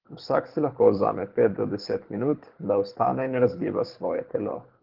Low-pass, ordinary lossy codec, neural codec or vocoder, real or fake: 5.4 kHz; Opus, 16 kbps; codec, 24 kHz, 6 kbps, HILCodec; fake